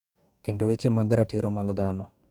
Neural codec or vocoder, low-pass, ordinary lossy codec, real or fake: codec, 44.1 kHz, 2.6 kbps, DAC; 19.8 kHz; none; fake